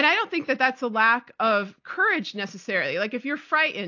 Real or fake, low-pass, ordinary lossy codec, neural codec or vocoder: real; 7.2 kHz; AAC, 48 kbps; none